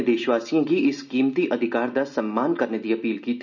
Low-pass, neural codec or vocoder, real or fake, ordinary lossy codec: 7.2 kHz; none; real; none